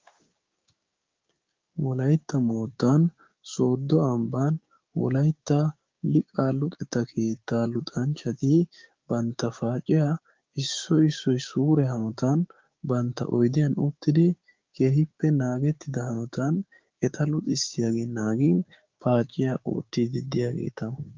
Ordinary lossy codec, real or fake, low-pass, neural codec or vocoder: Opus, 24 kbps; fake; 7.2 kHz; codec, 16 kHz, 6 kbps, DAC